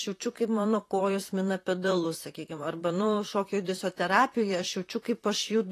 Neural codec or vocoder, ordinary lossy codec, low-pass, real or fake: vocoder, 44.1 kHz, 128 mel bands, Pupu-Vocoder; AAC, 48 kbps; 14.4 kHz; fake